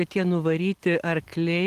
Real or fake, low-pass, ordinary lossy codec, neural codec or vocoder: real; 14.4 kHz; Opus, 24 kbps; none